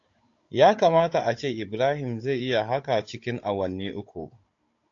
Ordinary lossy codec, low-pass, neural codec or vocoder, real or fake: AAC, 48 kbps; 7.2 kHz; codec, 16 kHz, 16 kbps, FunCodec, trained on Chinese and English, 50 frames a second; fake